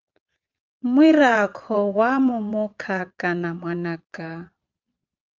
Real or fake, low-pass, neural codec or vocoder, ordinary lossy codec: fake; 7.2 kHz; vocoder, 22.05 kHz, 80 mel bands, Vocos; Opus, 32 kbps